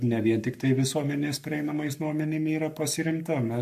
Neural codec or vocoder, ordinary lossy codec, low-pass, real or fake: codec, 44.1 kHz, 7.8 kbps, Pupu-Codec; MP3, 64 kbps; 14.4 kHz; fake